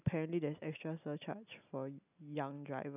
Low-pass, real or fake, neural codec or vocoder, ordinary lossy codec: 3.6 kHz; real; none; none